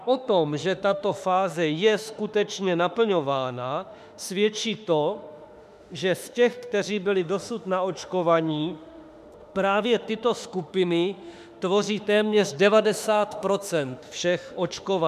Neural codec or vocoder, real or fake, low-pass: autoencoder, 48 kHz, 32 numbers a frame, DAC-VAE, trained on Japanese speech; fake; 14.4 kHz